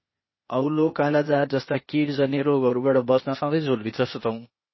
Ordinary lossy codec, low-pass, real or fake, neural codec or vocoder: MP3, 24 kbps; 7.2 kHz; fake; codec, 16 kHz, 0.8 kbps, ZipCodec